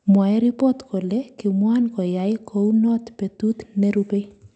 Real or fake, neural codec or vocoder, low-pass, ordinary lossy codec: real; none; 9.9 kHz; none